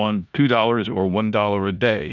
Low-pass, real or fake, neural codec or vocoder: 7.2 kHz; fake; codec, 16 kHz in and 24 kHz out, 0.9 kbps, LongCat-Audio-Codec, four codebook decoder